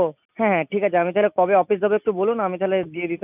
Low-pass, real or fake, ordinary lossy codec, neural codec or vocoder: 3.6 kHz; real; none; none